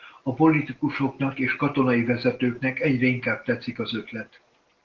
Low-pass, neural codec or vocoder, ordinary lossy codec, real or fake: 7.2 kHz; none; Opus, 16 kbps; real